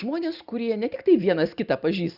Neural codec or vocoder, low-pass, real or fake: none; 5.4 kHz; real